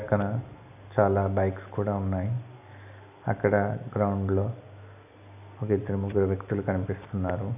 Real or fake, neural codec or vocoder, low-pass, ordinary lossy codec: real; none; 3.6 kHz; none